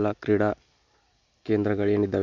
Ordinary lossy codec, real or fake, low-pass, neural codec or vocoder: none; real; 7.2 kHz; none